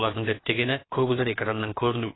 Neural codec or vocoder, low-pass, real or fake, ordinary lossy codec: codec, 16 kHz, about 1 kbps, DyCAST, with the encoder's durations; 7.2 kHz; fake; AAC, 16 kbps